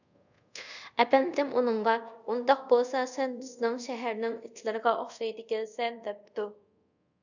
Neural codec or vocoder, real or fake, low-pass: codec, 24 kHz, 0.5 kbps, DualCodec; fake; 7.2 kHz